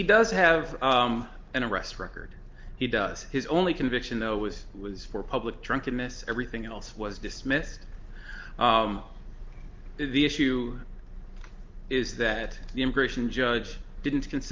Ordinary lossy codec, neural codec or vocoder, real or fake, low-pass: Opus, 32 kbps; none; real; 7.2 kHz